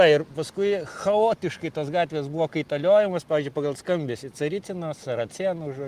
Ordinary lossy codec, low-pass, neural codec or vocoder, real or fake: Opus, 24 kbps; 14.4 kHz; none; real